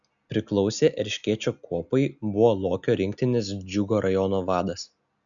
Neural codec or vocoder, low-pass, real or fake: none; 7.2 kHz; real